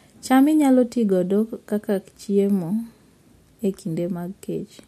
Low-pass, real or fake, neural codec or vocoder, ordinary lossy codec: 14.4 kHz; real; none; MP3, 64 kbps